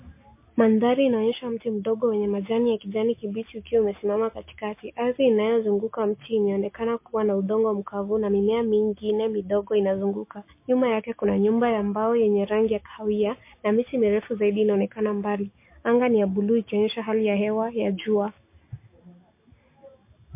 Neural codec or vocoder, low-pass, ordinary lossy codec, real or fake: none; 3.6 kHz; MP3, 24 kbps; real